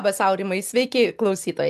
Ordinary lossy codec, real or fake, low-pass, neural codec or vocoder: MP3, 96 kbps; real; 14.4 kHz; none